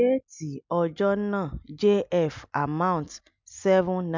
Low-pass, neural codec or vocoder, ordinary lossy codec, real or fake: 7.2 kHz; none; MP3, 64 kbps; real